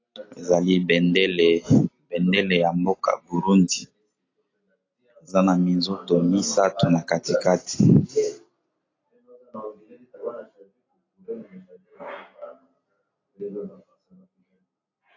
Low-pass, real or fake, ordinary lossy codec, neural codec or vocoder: 7.2 kHz; real; AAC, 32 kbps; none